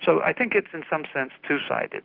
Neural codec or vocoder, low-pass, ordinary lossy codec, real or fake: none; 5.4 kHz; Opus, 32 kbps; real